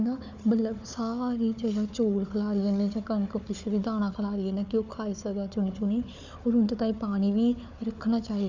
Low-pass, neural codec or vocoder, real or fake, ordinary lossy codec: 7.2 kHz; codec, 16 kHz, 4 kbps, FunCodec, trained on Chinese and English, 50 frames a second; fake; none